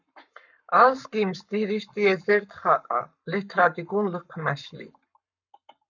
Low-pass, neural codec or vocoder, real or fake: 7.2 kHz; codec, 44.1 kHz, 7.8 kbps, Pupu-Codec; fake